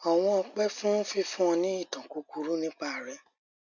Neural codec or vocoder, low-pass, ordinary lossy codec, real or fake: none; 7.2 kHz; none; real